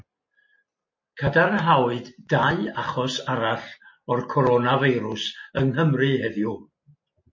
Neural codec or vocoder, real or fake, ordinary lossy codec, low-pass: none; real; MP3, 32 kbps; 7.2 kHz